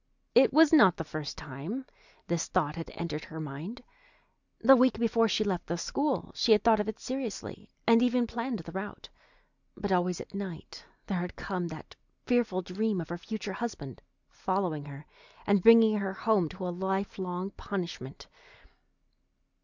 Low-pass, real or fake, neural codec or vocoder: 7.2 kHz; real; none